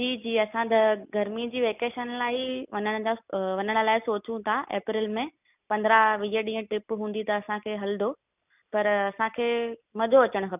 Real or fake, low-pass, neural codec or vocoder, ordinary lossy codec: real; 3.6 kHz; none; none